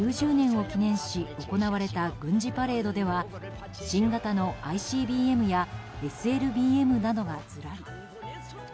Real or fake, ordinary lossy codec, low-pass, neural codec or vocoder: real; none; none; none